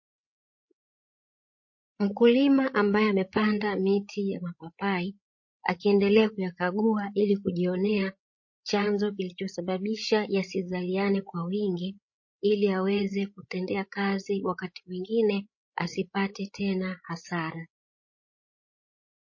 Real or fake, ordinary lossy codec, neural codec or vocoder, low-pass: fake; MP3, 32 kbps; codec, 16 kHz, 16 kbps, FreqCodec, larger model; 7.2 kHz